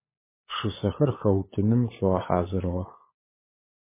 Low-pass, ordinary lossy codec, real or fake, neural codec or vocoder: 3.6 kHz; MP3, 16 kbps; fake; codec, 16 kHz, 16 kbps, FunCodec, trained on LibriTTS, 50 frames a second